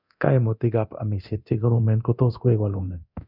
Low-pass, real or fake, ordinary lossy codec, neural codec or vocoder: 5.4 kHz; fake; none; codec, 24 kHz, 0.9 kbps, DualCodec